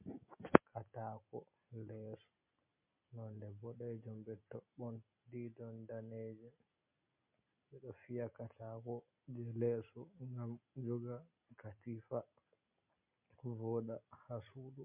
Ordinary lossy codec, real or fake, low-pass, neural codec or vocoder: MP3, 32 kbps; real; 3.6 kHz; none